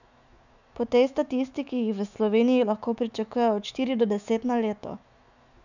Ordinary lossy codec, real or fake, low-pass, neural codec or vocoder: none; fake; 7.2 kHz; autoencoder, 48 kHz, 128 numbers a frame, DAC-VAE, trained on Japanese speech